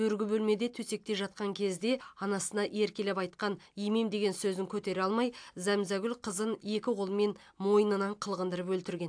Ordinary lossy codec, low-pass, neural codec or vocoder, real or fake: AAC, 64 kbps; 9.9 kHz; none; real